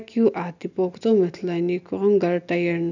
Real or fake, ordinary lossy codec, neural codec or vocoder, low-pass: real; none; none; 7.2 kHz